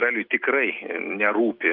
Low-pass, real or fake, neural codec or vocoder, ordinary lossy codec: 5.4 kHz; real; none; Opus, 24 kbps